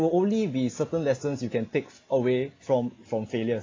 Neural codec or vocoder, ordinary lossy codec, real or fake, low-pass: none; AAC, 32 kbps; real; 7.2 kHz